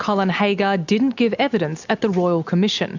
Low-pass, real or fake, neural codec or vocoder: 7.2 kHz; real; none